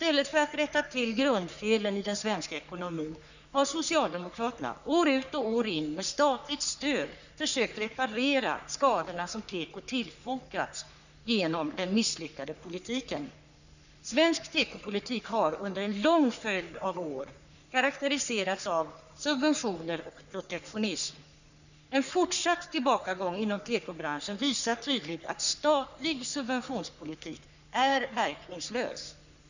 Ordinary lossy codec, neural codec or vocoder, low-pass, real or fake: none; codec, 44.1 kHz, 3.4 kbps, Pupu-Codec; 7.2 kHz; fake